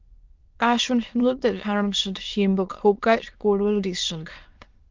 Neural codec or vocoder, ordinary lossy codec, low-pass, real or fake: autoencoder, 22.05 kHz, a latent of 192 numbers a frame, VITS, trained on many speakers; Opus, 32 kbps; 7.2 kHz; fake